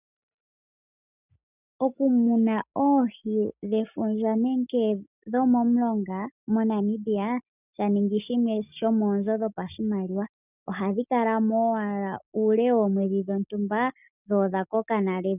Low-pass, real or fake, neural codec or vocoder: 3.6 kHz; real; none